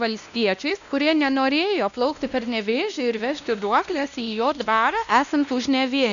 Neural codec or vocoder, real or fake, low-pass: codec, 16 kHz, 1 kbps, X-Codec, WavLM features, trained on Multilingual LibriSpeech; fake; 7.2 kHz